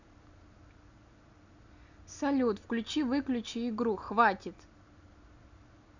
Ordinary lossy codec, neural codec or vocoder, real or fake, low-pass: none; none; real; 7.2 kHz